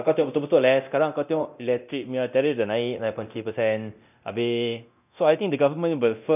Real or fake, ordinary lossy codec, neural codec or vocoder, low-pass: fake; none; codec, 24 kHz, 0.9 kbps, DualCodec; 3.6 kHz